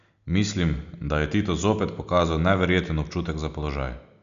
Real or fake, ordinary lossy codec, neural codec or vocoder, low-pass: real; none; none; 7.2 kHz